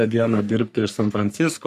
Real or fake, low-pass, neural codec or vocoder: fake; 14.4 kHz; codec, 44.1 kHz, 3.4 kbps, Pupu-Codec